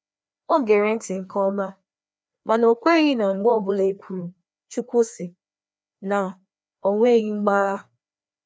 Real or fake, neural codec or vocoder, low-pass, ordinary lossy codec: fake; codec, 16 kHz, 2 kbps, FreqCodec, larger model; none; none